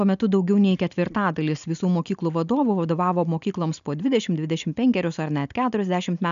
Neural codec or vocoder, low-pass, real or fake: none; 7.2 kHz; real